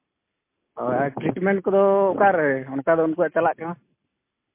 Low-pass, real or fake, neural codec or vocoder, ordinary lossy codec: 3.6 kHz; real; none; MP3, 24 kbps